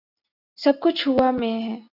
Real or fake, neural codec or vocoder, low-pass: real; none; 5.4 kHz